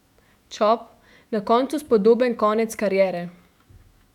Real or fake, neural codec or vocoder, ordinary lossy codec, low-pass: fake; autoencoder, 48 kHz, 128 numbers a frame, DAC-VAE, trained on Japanese speech; none; 19.8 kHz